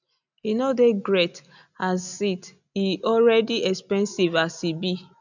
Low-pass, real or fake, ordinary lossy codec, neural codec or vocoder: 7.2 kHz; real; none; none